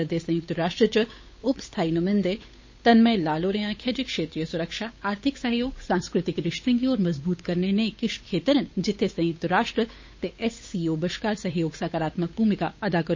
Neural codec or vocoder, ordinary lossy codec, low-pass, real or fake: codec, 16 kHz, 8 kbps, FunCodec, trained on Chinese and English, 25 frames a second; MP3, 32 kbps; 7.2 kHz; fake